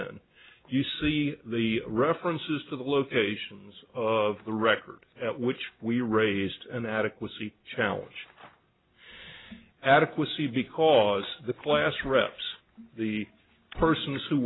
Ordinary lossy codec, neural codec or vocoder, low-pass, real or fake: AAC, 16 kbps; none; 7.2 kHz; real